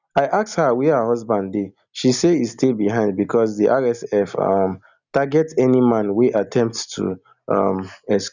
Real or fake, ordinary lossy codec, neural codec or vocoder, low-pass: real; none; none; 7.2 kHz